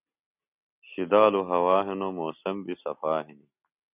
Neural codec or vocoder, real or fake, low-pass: none; real; 3.6 kHz